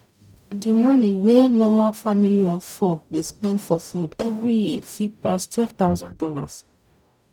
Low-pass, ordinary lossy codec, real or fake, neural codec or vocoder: 19.8 kHz; none; fake; codec, 44.1 kHz, 0.9 kbps, DAC